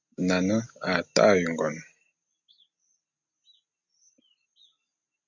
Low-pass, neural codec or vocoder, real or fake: 7.2 kHz; none; real